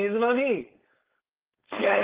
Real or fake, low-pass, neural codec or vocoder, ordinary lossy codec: fake; 3.6 kHz; codec, 16 kHz, 4.8 kbps, FACodec; Opus, 16 kbps